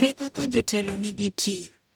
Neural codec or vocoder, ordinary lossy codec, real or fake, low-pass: codec, 44.1 kHz, 0.9 kbps, DAC; none; fake; none